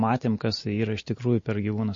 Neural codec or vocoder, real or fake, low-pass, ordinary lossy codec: none; real; 7.2 kHz; MP3, 32 kbps